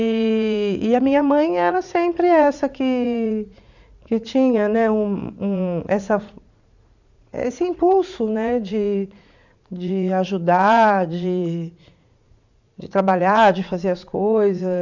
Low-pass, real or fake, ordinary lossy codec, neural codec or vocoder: 7.2 kHz; fake; none; vocoder, 44.1 kHz, 80 mel bands, Vocos